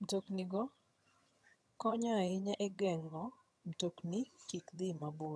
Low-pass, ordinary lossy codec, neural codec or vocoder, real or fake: none; none; vocoder, 22.05 kHz, 80 mel bands, HiFi-GAN; fake